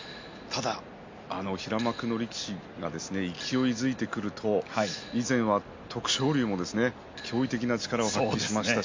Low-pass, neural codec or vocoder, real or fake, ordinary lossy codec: 7.2 kHz; none; real; MP3, 48 kbps